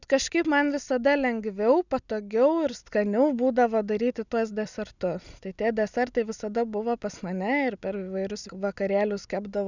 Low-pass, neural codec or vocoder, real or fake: 7.2 kHz; none; real